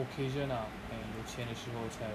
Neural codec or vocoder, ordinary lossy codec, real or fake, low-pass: none; none; real; 14.4 kHz